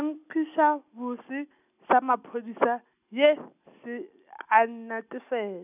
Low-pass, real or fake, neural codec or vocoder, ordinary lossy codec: 3.6 kHz; real; none; none